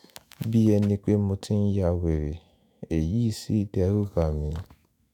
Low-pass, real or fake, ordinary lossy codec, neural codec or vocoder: 19.8 kHz; fake; none; autoencoder, 48 kHz, 128 numbers a frame, DAC-VAE, trained on Japanese speech